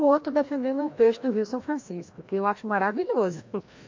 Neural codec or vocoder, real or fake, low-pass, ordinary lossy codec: codec, 16 kHz, 1 kbps, FreqCodec, larger model; fake; 7.2 kHz; MP3, 48 kbps